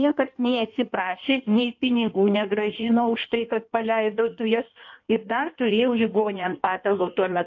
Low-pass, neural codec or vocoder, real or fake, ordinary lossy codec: 7.2 kHz; codec, 16 kHz in and 24 kHz out, 1.1 kbps, FireRedTTS-2 codec; fake; AAC, 48 kbps